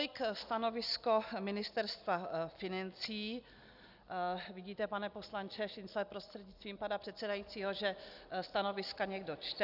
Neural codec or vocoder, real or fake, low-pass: none; real; 5.4 kHz